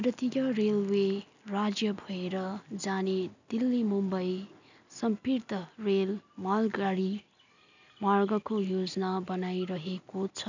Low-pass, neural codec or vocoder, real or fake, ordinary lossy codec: 7.2 kHz; none; real; none